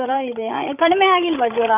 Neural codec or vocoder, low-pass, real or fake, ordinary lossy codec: codec, 16 kHz, 16 kbps, FreqCodec, larger model; 3.6 kHz; fake; none